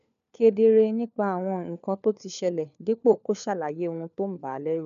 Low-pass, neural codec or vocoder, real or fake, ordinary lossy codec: 7.2 kHz; codec, 16 kHz, 4 kbps, FunCodec, trained on LibriTTS, 50 frames a second; fake; MP3, 96 kbps